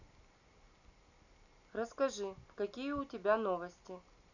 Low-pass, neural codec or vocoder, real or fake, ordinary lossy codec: 7.2 kHz; none; real; none